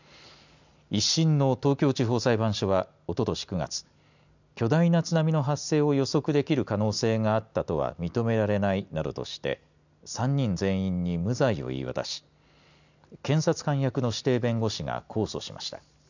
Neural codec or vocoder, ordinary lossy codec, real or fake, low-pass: none; none; real; 7.2 kHz